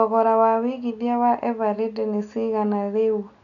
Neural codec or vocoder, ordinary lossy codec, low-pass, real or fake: none; none; 7.2 kHz; real